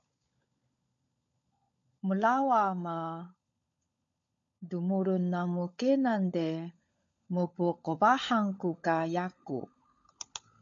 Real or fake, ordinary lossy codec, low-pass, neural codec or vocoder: fake; MP3, 64 kbps; 7.2 kHz; codec, 16 kHz, 16 kbps, FunCodec, trained on LibriTTS, 50 frames a second